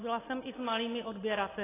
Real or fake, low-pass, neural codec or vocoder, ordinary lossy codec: real; 3.6 kHz; none; AAC, 16 kbps